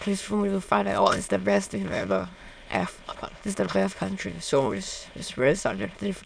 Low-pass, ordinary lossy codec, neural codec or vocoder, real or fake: none; none; autoencoder, 22.05 kHz, a latent of 192 numbers a frame, VITS, trained on many speakers; fake